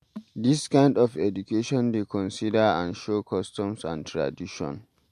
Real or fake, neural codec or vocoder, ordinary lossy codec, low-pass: real; none; MP3, 64 kbps; 14.4 kHz